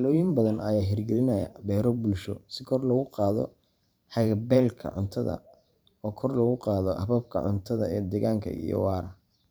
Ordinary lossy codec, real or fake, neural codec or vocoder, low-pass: none; fake; vocoder, 44.1 kHz, 128 mel bands every 512 samples, BigVGAN v2; none